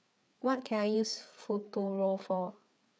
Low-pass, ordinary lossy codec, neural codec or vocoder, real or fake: none; none; codec, 16 kHz, 4 kbps, FreqCodec, larger model; fake